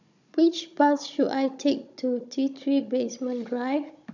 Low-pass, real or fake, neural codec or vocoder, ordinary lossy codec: 7.2 kHz; fake; codec, 16 kHz, 16 kbps, FunCodec, trained on Chinese and English, 50 frames a second; none